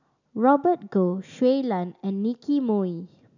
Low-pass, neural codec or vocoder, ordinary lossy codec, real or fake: 7.2 kHz; none; none; real